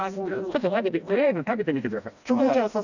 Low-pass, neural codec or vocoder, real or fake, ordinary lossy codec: 7.2 kHz; codec, 16 kHz, 1 kbps, FreqCodec, smaller model; fake; none